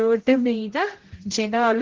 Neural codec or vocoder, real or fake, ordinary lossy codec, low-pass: codec, 16 kHz, 0.5 kbps, X-Codec, HuBERT features, trained on general audio; fake; Opus, 16 kbps; 7.2 kHz